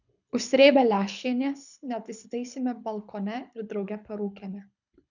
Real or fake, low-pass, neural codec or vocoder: fake; 7.2 kHz; codec, 24 kHz, 6 kbps, HILCodec